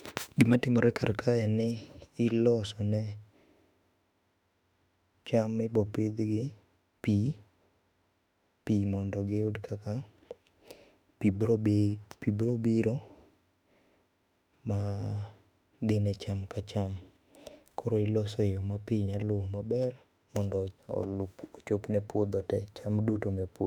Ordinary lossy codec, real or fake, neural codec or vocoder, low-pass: none; fake; autoencoder, 48 kHz, 32 numbers a frame, DAC-VAE, trained on Japanese speech; 19.8 kHz